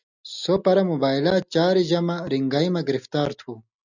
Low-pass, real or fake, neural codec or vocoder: 7.2 kHz; real; none